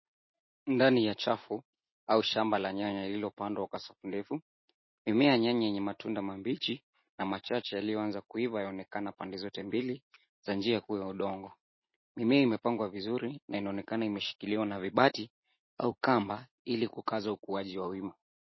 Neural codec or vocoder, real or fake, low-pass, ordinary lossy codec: none; real; 7.2 kHz; MP3, 24 kbps